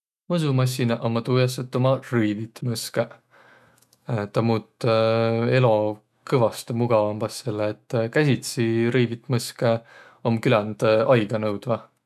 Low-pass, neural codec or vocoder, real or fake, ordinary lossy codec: 14.4 kHz; autoencoder, 48 kHz, 128 numbers a frame, DAC-VAE, trained on Japanese speech; fake; none